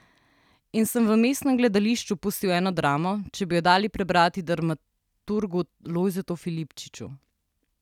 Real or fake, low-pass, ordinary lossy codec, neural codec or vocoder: real; 19.8 kHz; none; none